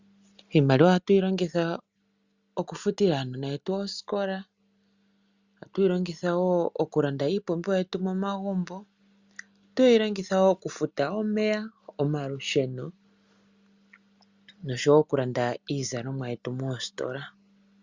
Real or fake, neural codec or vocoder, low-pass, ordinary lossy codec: real; none; 7.2 kHz; Opus, 64 kbps